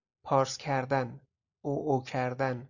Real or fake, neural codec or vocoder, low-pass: real; none; 7.2 kHz